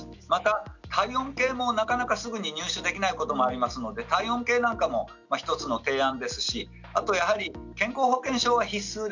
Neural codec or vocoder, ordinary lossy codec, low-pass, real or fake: none; none; 7.2 kHz; real